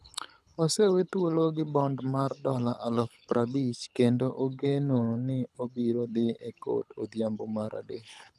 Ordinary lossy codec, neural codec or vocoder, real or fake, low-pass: none; codec, 24 kHz, 6 kbps, HILCodec; fake; none